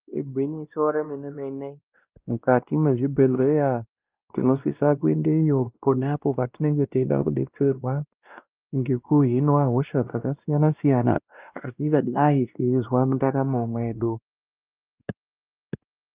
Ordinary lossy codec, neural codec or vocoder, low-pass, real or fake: Opus, 24 kbps; codec, 16 kHz, 1 kbps, X-Codec, WavLM features, trained on Multilingual LibriSpeech; 3.6 kHz; fake